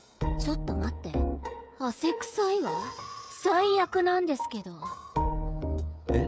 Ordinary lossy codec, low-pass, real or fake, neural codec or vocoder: none; none; fake; codec, 16 kHz, 16 kbps, FreqCodec, smaller model